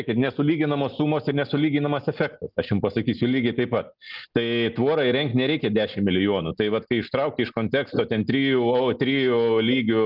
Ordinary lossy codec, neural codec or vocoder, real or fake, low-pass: Opus, 32 kbps; none; real; 5.4 kHz